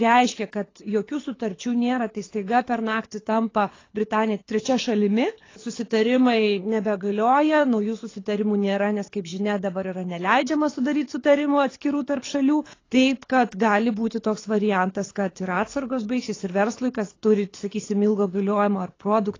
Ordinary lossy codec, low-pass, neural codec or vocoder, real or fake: AAC, 32 kbps; 7.2 kHz; codec, 24 kHz, 6 kbps, HILCodec; fake